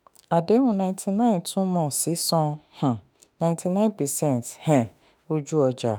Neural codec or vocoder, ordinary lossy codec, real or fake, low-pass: autoencoder, 48 kHz, 32 numbers a frame, DAC-VAE, trained on Japanese speech; none; fake; none